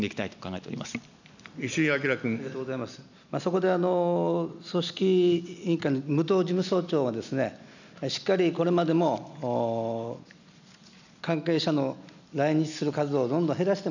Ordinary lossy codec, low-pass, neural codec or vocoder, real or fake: none; 7.2 kHz; none; real